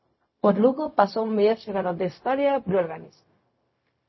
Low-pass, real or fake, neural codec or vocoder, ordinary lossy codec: 7.2 kHz; fake; codec, 16 kHz, 0.4 kbps, LongCat-Audio-Codec; MP3, 24 kbps